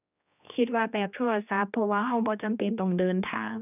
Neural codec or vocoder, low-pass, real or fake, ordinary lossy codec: codec, 16 kHz, 2 kbps, X-Codec, HuBERT features, trained on general audio; 3.6 kHz; fake; none